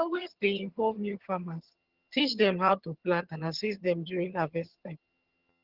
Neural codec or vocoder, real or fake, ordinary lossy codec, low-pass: vocoder, 22.05 kHz, 80 mel bands, HiFi-GAN; fake; Opus, 16 kbps; 5.4 kHz